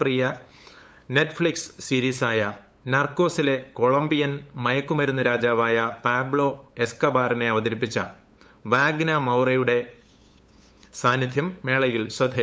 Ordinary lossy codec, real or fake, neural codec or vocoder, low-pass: none; fake; codec, 16 kHz, 8 kbps, FunCodec, trained on LibriTTS, 25 frames a second; none